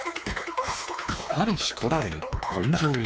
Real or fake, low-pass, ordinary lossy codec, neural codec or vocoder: fake; none; none; codec, 16 kHz, 2 kbps, X-Codec, WavLM features, trained on Multilingual LibriSpeech